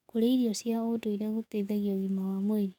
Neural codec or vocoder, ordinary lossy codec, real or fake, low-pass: codec, 44.1 kHz, 7.8 kbps, DAC; none; fake; 19.8 kHz